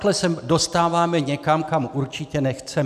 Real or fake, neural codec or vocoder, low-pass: real; none; 14.4 kHz